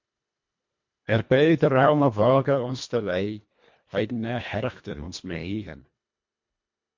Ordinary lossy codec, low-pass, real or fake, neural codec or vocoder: MP3, 48 kbps; 7.2 kHz; fake; codec, 24 kHz, 1.5 kbps, HILCodec